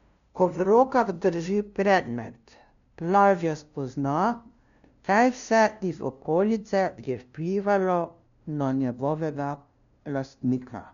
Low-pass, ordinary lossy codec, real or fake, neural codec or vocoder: 7.2 kHz; none; fake; codec, 16 kHz, 0.5 kbps, FunCodec, trained on LibriTTS, 25 frames a second